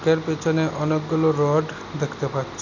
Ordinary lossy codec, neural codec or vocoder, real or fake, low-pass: none; none; real; 7.2 kHz